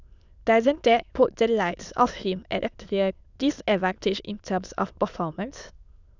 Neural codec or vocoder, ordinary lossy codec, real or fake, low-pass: autoencoder, 22.05 kHz, a latent of 192 numbers a frame, VITS, trained on many speakers; none; fake; 7.2 kHz